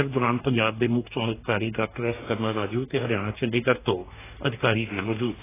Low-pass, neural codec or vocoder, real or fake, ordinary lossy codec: 3.6 kHz; codec, 44.1 kHz, 2.6 kbps, DAC; fake; AAC, 16 kbps